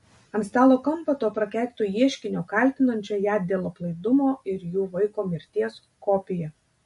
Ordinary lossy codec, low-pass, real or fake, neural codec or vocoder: MP3, 48 kbps; 14.4 kHz; real; none